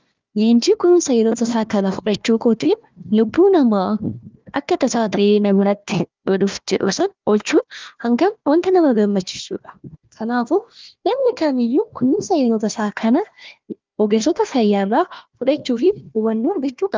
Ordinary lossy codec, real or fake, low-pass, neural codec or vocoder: Opus, 24 kbps; fake; 7.2 kHz; codec, 16 kHz, 1 kbps, FunCodec, trained on Chinese and English, 50 frames a second